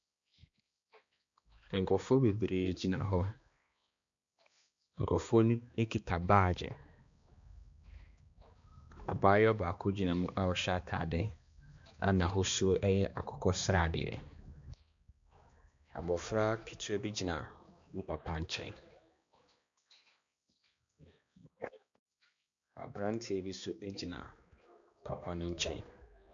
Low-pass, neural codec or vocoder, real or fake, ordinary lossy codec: 7.2 kHz; codec, 16 kHz, 2 kbps, X-Codec, HuBERT features, trained on balanced general audio; fake; MP3, 64 kbps